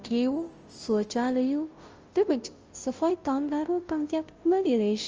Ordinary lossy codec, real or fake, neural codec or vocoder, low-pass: Opus, 24 kbps; fake; codec, 16 kHz, 0.5 kbps, FunCodec, trained on Chinese and English, 25 frames a second; 7.2 kHz